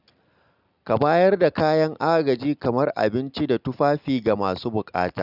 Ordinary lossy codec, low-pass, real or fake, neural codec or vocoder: none; 5.4 kHz; real; none